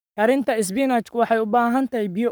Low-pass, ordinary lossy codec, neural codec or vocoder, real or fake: none; none; codec, 44.1 kHz, 7.8 kbps, Pupu-Codec; fake